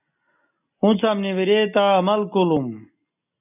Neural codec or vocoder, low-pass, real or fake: none; 3.6 kHz; real